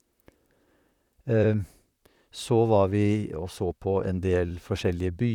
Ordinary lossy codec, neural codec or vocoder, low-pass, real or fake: none; vocoder, 44.1 kHz, 128 mel bands, Pupu-Vocoder; 19.8 kHz; fake